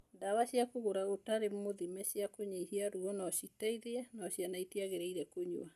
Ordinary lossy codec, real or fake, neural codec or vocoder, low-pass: none; real; none; 14.4 kHz